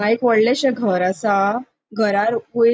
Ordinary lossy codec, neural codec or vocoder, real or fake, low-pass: none; none; real; none